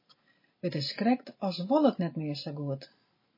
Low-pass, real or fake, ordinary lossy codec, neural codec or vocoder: 5.4 kHz; real; MP3, 24 kbps; none